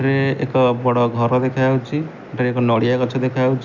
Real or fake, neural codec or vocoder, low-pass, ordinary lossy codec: real; none; 7.2 kHz; none